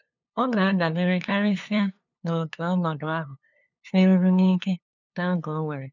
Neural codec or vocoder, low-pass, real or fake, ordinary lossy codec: codec, 16 kHz, 2 kbps, FunCodec, trained on LibriTTS, 25 frames a second; 7.2 kHz; fake; none